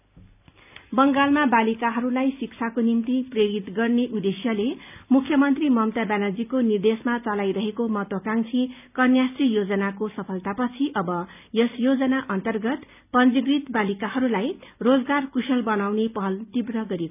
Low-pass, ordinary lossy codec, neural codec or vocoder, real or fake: 3.6 kHz; none; none; real